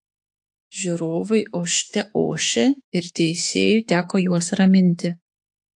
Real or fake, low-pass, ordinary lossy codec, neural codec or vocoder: fake; 10.8 kHz; AAC, 64 kbps; autoencoder, 48 kHz, 32 numbers a frame, DAC-VAE, trained on Japanese speech